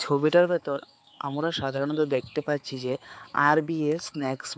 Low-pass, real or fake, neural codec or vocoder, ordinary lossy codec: none; fake; codec, 16 kHz, 4 kbps, X-Codec, HuBERT features, trained on balanced general audio; none